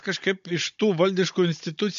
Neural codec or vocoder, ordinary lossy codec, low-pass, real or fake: codec, 16 kHz, 16 kbps, FunCodec, trained on Chinese and English, 50 frames a second; MP3, 48 kbps; 7.2 kHz; fake